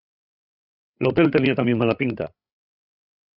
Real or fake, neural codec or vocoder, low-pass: fake; codec, 16 kHz, 8 kbps, FreqCodec, larger model; 5.4 kHz